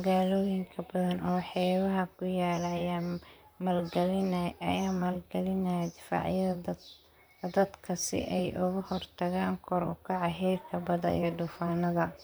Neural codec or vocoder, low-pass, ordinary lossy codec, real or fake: vocoder, 44.1 kHz, 128 mel bands, Pupu-Vocoder; none; none; fake